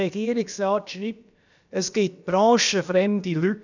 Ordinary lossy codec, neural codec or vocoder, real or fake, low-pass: none; codec, 16 kHz, about 1 kbps, DyCAST, with the encoder's durations; fake; 7.2 kHz